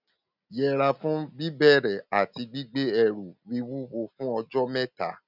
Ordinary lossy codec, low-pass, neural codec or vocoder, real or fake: none; 5.4 kHz; none; real